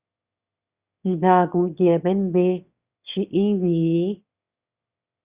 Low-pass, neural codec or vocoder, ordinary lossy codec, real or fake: 3.6 kHz; autoencoder, 22.05 kHz, a latent of 192 numbers a frame, VITS, trained on one speaker; Opus, 64 kbps; fake